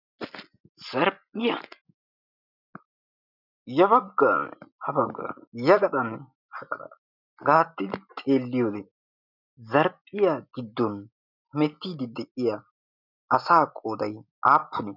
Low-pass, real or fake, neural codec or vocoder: 5.4 kHz; real; none